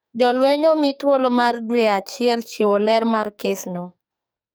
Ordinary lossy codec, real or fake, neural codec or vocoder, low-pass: none; fake; codec, 44.1 kHz, 2.6 kbps, SNAC; none